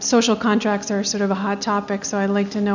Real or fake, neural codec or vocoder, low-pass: real; none; 7.2 kHz